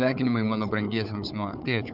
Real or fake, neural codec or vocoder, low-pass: fake; codec, 16 kHz, 4 kbps, FunCodec, trained on Chinese and English, 50 frames a second; 5.4 kHz